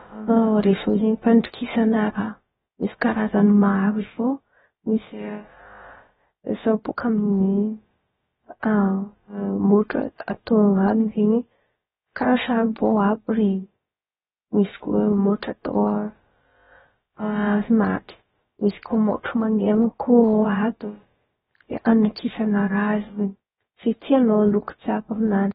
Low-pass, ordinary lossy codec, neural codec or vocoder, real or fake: 7.2 kHz; AAC, 16 kbps; codec, 16 kHz, about 1 kbps, DyCAST, with the encoder's durations; fake